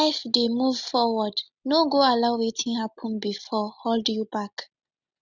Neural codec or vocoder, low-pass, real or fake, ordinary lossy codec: none; 7.2 kHz; real; none